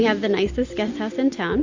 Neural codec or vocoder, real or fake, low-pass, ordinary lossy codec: none; real; 7.2 kHz; AAC, 48 kbps